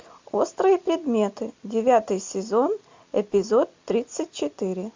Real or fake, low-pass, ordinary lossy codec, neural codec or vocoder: real; 7.2 kHz; MP3, 48 kbps; none